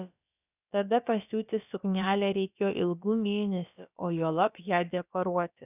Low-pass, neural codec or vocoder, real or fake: 3.6 kHz; codec, 16 kHz, about 1 kbps, DyCAST, with the encoder's durations; fake